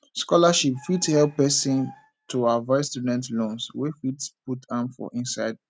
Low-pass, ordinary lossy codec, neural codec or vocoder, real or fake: none; none; none; real